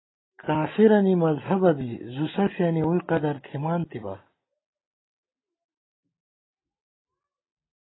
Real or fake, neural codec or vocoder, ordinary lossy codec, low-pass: real; none; AAC, 16 kbps; 7.2 kHz